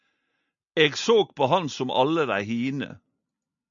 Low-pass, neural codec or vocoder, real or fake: 7.2 kHz; none; real